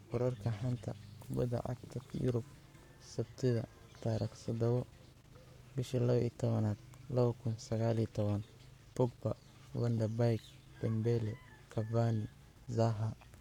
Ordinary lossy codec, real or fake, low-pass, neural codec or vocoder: none; fake; 19.8 kHz; codec, 44.1 kHz, 7.8 kbps, Pupu-Codec